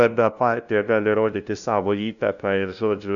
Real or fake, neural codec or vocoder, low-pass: fake; codec, 16 kHz, 0.5 kbps, FunCodec, trained on LibriTTS, 25 frames a second; 7.2 kHz